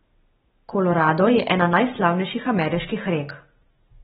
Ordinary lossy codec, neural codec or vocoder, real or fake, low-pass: AAC, 16 kbps; none; real; 7.2 kHz